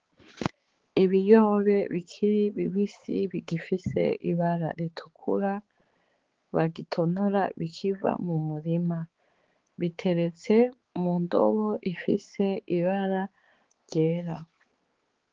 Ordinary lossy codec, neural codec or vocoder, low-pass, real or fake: Opus, 16 kbps; codec, 16 kHz, 4 kbps, X-Codec, HuBERT features, trained on balanced general audio; 7.2 kHz; fake